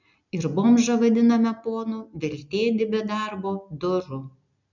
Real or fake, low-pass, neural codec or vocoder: real; 7.2 kHz; none